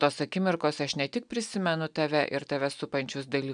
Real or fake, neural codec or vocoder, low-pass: real; none; 9.9 kHz